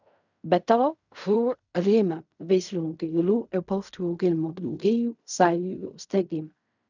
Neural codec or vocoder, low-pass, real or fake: codec, 16 kHz in and 24 kHz out, 0.4 kbps, LongCat-Audio-Codec, fine tuned four codebook decoder; 7.2 kHz; fake